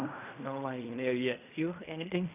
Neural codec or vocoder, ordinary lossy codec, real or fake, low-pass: codec, 16 kHz in and 24 kHz out, 0.4 kbps, LongCat-Audio-Codec, fine tuned four codebook decoder; none; fake; 3.6 kHz